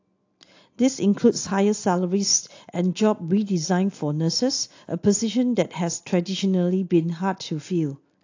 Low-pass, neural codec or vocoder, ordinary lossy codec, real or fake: 7.2 kHz; none; AAC, 48 kbps; real